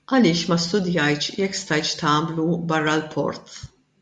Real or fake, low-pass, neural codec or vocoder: real; 10.8 kHz; none